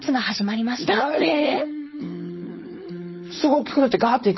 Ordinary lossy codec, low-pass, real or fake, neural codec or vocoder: MP3, 24 kbps; 7.2 kHz; fake; codec, 16 kHz, 4.8 kbps, FACodec